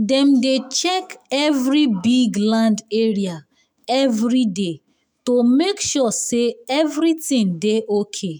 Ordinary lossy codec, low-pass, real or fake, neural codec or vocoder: none; none; fake; autoencoder, 48 kHz, 128 numbers a frame, DAC-VAE, trained on Japanese speech